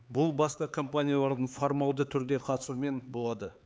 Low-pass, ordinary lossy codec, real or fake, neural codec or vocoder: none; none; fake; codec, 16 kHz, 4 kbps, X-Codec, HuBERT features, trained on LibriSpeech